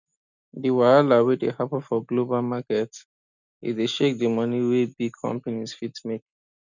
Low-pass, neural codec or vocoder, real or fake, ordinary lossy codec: 7.2 kHz; none; real; none